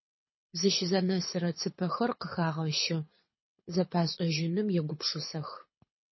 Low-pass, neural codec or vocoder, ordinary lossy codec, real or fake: 7.2 kHz; codec, 24 kHz, 6 kbps, HILCodec; MP3, 24 kbps; fake